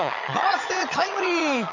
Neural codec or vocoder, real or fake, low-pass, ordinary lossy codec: vocoder, 22.05 kHz, 80 mel bands, HiFi-GAN; fake; 7.2 kHz; MP3, 48 kbps